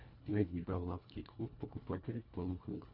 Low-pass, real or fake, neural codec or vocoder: 5.4 kHz; fake; codec, 24 kHz, 1.5 kbps, HILCodec